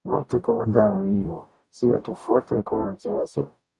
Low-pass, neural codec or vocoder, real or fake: 10.8 kHz; codec, 44.1 kHz, 0.9 kbps, DAC; fake